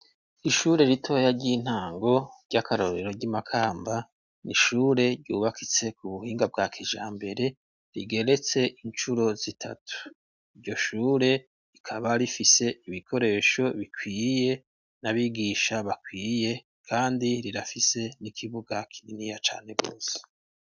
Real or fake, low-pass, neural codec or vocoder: real; 7.2 kHz; none